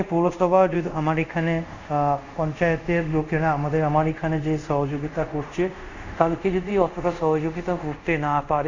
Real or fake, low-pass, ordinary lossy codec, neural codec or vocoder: fake; 7.2 kHz; Opus, 64 kbps; codec, 24 kHz, 0.5 kbps, DualCodec